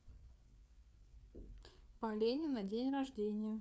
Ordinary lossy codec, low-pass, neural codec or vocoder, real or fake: none; none; codec, 16 kHz, 4 kbps, FreqCodec, larger model; fake